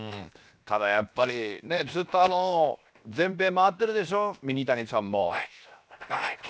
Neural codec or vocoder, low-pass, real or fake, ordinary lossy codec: codec, 16 kHz, 0.7 kbps, FocalCodec; none; fake; none